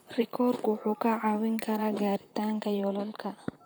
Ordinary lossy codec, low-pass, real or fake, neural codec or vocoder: none; none; fake; vocoder, 44.1 kHz, 128 mel bands, Pupu-Vocoder